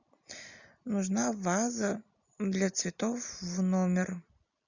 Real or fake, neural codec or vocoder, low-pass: real; none; 7.2 kHz